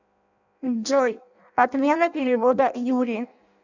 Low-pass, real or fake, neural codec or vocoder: 7.2 kHz; fake; codec, 16 kHz in and 24 kHz out, 0.6 kbps, FireRedTTS-2 codec